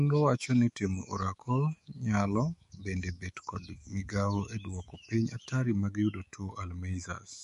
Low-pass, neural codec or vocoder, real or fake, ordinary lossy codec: 14.4 kHz; autoencoder, 48 kHz, 128 numbers a frame, DAC-VAE, trained on Japanese speech; fake; MP3, 48 kbps